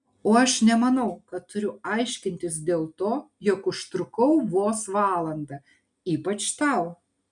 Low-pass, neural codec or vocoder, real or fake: 10.8 kHz; none; real